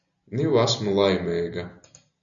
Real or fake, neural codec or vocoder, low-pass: real; none; 7.2 kHz